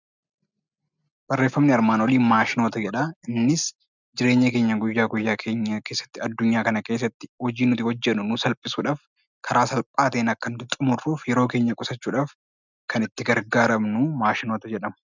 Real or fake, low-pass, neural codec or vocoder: real; 7.2 kHz; none